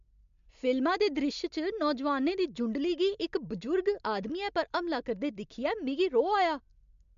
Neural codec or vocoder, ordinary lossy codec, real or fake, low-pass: none; MP3, 64 kbps; real; 7.2 kHz